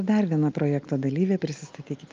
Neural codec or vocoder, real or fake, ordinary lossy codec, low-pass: none; real; Opus, 24 kbps; 7.2 kHz